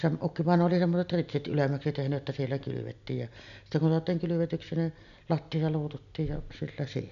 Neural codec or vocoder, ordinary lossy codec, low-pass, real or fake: none; none; 7.2 kHz; real